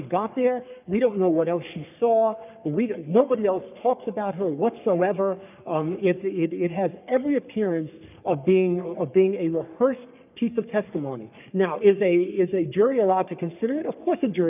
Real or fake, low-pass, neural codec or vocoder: fake; 3.6 kHz; codec, 44.1 kHz, 3.4 kbps, Pupu-Codec